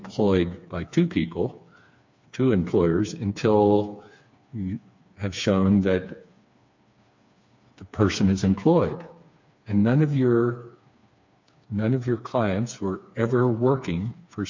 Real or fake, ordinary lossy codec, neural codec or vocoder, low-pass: fake; MP3, 48 kbps; codec, 16 kHz, 4 kbps, FreqCodec, smaller model; 7.2 kHz